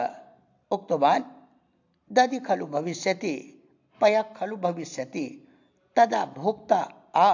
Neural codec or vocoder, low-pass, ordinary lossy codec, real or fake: none; 7.2 kHz; AAC, 48 kbps; real